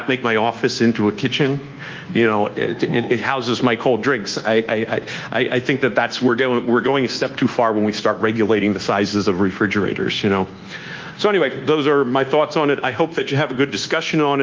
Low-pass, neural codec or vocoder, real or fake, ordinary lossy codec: 7.2 kHz; codec, 24 kHz, 1.2 kbps, DualCodec; fake; Opus, 24 kbps